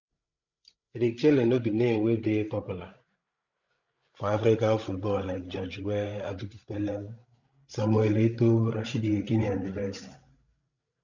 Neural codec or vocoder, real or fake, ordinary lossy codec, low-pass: codec, 16 kHz, 16 kbps, FreqCodec, larger model; fake; none; 7.2 kHz